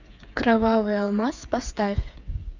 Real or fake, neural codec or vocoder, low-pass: fake; codec, 16 kHz, 8 kbps, FreqCodec, smaller model; 7.2 kHz